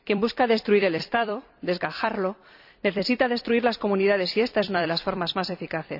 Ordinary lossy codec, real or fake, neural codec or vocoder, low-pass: none; fake; vocoder, 44.1 kHz, 128 mel bands every 256 samples, BigVGAN v2; 5.4 kHz